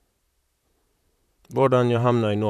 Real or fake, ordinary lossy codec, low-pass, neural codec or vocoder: fake; none; 14.4 kHz; vocoder, 44.1 kHz, 128 mel bands, Pupu-Vocoder